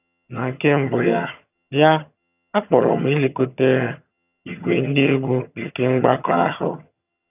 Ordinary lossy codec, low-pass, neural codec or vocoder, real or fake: none; 3.6 kHz; vocoder, 22.05 kHz, 80 mel bands, HiFi-GAN; fake